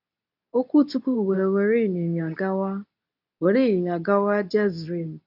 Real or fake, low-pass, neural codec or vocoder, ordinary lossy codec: fake; 5.4 kHz; codec, 24 kHz, 0.9 kbps, WavTokenizer, medium speech release version 2; none